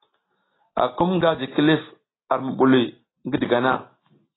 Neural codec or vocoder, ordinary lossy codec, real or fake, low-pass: none; AAC, 16 kbps; real; 7.2 kHz